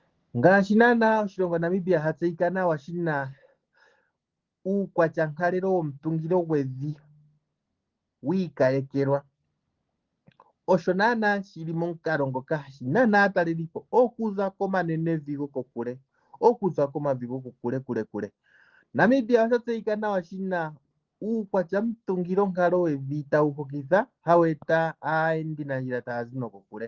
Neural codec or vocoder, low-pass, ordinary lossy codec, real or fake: none; 7.2 kHz; Opus, 16 kbps; real